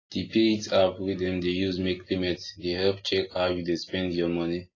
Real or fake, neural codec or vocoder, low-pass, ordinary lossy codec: real; none; 7.2 kHz; AAC, 32 kbps